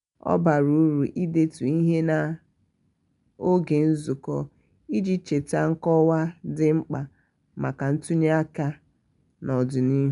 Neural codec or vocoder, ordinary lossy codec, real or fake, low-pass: none; MP3, 96 kbps; real; 10.8 kHz